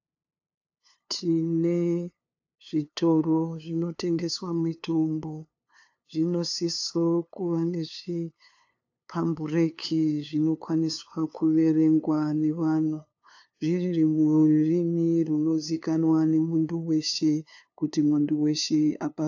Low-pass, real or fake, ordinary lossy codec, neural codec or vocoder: 7.2 kHz; fake; AAC, 48 kbps; codec, 16 kHz, 2 kbps, FunCodec, trained on LibriTTS, 25 frames a second